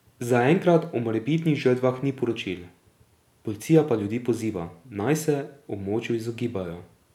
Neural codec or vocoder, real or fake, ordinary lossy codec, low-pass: none; real; none; 19.8 kHz